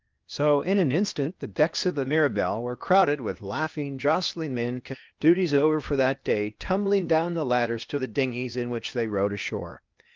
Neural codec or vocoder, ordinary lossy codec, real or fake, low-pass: codec, 16 kHz, 0.8 kbps, ZipCodec; Opus, 24 kbps; fake; 7.2 kHz